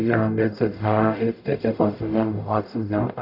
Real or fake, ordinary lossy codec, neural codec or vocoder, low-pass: fake; none; codec, 44.1 kHz, 0.9 kbps, DAC; 5.4 kHz